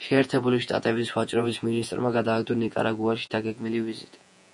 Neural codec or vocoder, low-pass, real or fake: vocoder, 48 kHz, 128 mel bands, Vocos; 10.8 kHz; fake